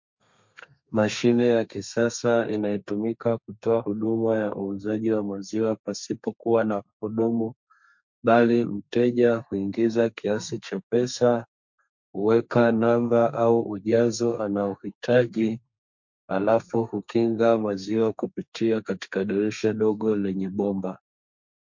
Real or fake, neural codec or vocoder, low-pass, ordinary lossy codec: fake; codec, 32 kHz, 1.9 kbps, SNAC; 7.2 kHz; MP3, 48 kbps